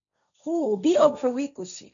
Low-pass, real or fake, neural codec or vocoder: 7.2 kHz; fake; codec, 16 kHz, 1.1 kbps, Voila-Tokenizer